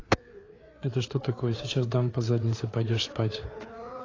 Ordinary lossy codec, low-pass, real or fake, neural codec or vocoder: AAC, 32 kbps; 7.2 kHz; fake; codec, 16 kHz, 4 kbps, FreqCodec, larger model